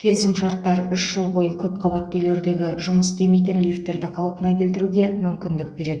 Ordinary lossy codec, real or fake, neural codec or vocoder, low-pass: Opus, 64 kbps; fake; codec, 32 kHz, 1.9 kbps, SNAC; 9.9 kHz